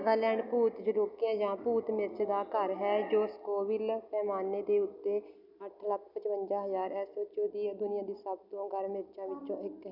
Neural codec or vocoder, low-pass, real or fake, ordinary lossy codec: none; 5.4 kHz; real; none